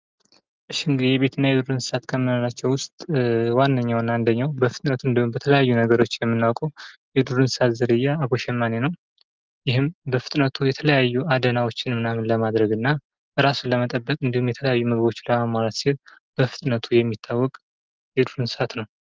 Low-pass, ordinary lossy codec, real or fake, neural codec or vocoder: 7.2 kHz; Opus, 32 kbps; real; none